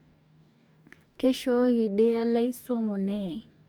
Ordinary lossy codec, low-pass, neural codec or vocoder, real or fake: none; none; codec, 44.1 kHz, 2.6 kbps, DAC; fake